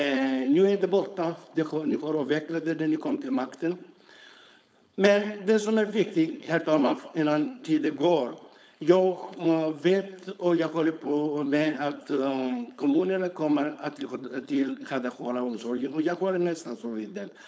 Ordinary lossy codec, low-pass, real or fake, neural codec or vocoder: none; none; fake; codec, 16 kHz, 4.8 kbps, FACodec